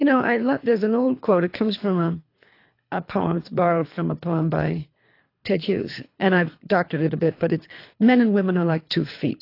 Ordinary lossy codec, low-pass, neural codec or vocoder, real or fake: AAC, 32 kbps; 5.4 kHz; codec, 24 kHz, 3 kbps, HILCodec; fake